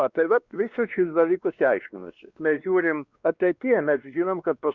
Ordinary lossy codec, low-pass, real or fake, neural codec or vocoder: Opus, 64 kbps; 7.2 kHz; fake; codec, 16 kHz, 2 kbps, X-Codec, WavLM features, trained on Multilingual LibriSpeech